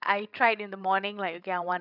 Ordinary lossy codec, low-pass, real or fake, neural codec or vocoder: none; 5.4 kHz; fake; codec, 16 kHz, 16 kbps, FreqCodec, larger model